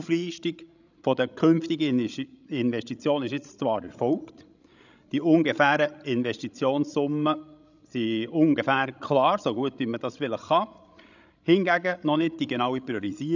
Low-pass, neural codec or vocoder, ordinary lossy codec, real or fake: 7.2 kHz; codec, 16 kHz, 16 kbps, FreqCodec, larger model; none; fake